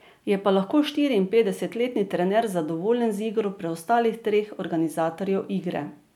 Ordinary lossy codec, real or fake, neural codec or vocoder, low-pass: none; real; none; 19.8 kHz